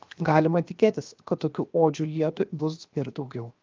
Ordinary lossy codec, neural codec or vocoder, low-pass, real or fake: Opus, 24 kbps; codec, 16 kHz, 0.7 kbps, FocalCodec; 7.2 kHz; fake